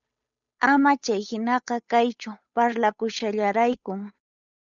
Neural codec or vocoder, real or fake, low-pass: codec, 16 kHz, 8 kbps, FunCodec, trained on Chinese and English, 25 frames a second; fake; 7.2 kHz